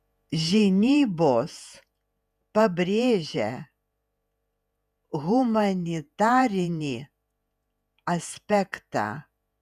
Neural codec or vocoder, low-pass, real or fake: vocoder, 48 kHz, 128 mel bands, Vocos; 14.4 kHz; fake